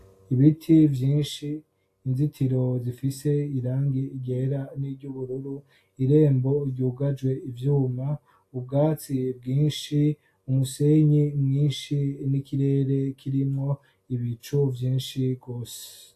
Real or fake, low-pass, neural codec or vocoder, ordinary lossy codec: real; 14.4 kHz; none; AAC, 64 kbps